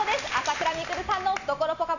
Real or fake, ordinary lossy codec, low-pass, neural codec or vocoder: real; none; 7.2 kHz; none